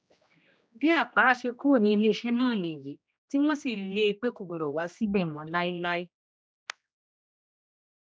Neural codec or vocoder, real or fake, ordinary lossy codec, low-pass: codec, 16 kHz, 1 kbps, X-Codec, HuBERT features, trained on general audio; fake; none; none